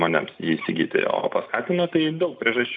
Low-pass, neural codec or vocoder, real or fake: 7.2 kHz; none; real